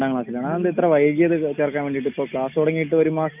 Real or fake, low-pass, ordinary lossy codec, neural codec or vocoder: real; 3.6 kHz; none; none